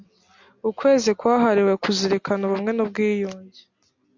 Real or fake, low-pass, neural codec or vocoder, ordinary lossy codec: real; 7.2 kHz; none; MP3, 48 kbps